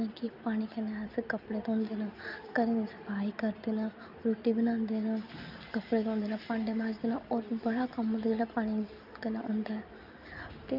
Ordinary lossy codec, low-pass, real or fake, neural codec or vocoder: none; 5.4 kHz; real; none